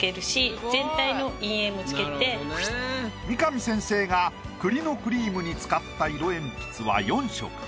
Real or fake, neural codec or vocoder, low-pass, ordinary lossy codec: real; none; none; none